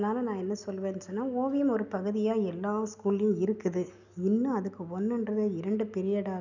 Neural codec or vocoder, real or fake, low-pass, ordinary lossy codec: none; real; 7.2 kHz; none